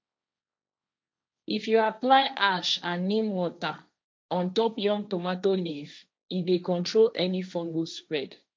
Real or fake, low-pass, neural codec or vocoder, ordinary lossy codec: fake; none; codec, 16 kHz, 1.1 kbps, Voila-Tokenizer; none